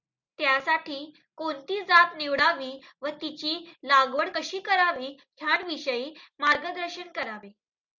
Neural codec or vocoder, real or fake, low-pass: none; real; 7.2 kHz